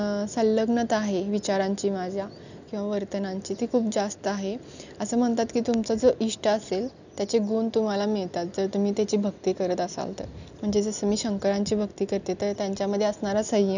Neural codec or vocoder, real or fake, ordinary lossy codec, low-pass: none; real; none; 7.2 kHz